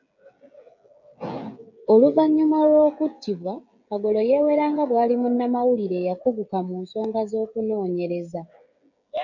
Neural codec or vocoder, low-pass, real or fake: codec, 16 kHz, 16 kbps, FreqCodec, smaller model; 7.2 kHz; fake